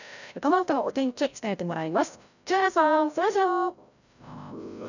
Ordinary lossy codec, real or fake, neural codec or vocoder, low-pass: none; fake; codec, 16 kHz, 0.5 kbps, FreqCodec, larger model; 7.2 kHz